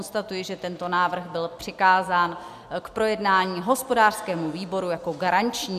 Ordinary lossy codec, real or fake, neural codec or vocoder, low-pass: AAC, 96 kbps; real; none; 14.4 kHz